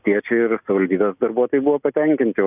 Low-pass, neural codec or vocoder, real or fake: 3.6 kHz; none; real